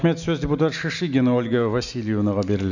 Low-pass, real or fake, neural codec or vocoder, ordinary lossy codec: 7.2 kHz; real; none; none